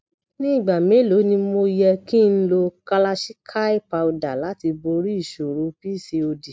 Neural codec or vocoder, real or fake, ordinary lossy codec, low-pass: none; real; none; none